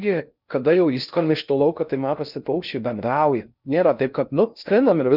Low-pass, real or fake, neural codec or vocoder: 5.4 kHz; fake; codec, 16 kHz in and 24 kHz out, 0.6 kbps, FocalCodec, streaming, 4096 codes